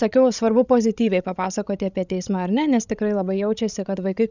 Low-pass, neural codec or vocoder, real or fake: 7.2 kHz; codec, 16 kHz, 16 kbps, FreqCodec, larger model; fake